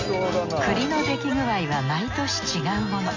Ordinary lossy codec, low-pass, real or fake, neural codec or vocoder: none; 7.2 kHz; real; none